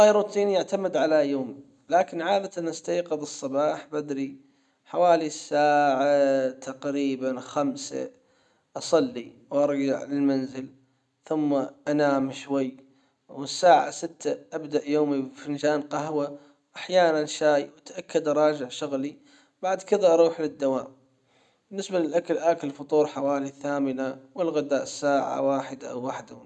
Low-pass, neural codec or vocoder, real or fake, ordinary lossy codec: none; none; real; none